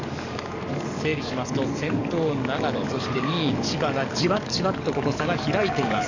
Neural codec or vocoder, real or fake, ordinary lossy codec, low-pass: codec, 44.1 kHz, 7.8 kbps, DAC; fake; none; 7.2 kHz